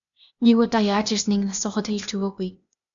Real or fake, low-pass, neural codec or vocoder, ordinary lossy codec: fake; 7.2 kHz; codec, 16 kHz, 0.8 kbps, ZipCodec; MP3, 96 kbps